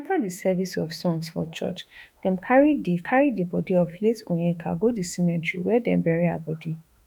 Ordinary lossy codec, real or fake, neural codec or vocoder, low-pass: none; fake; autoencoder, 48 kHz, 32 numbers a frame, DAC-VAE, trained on Japanese speech; none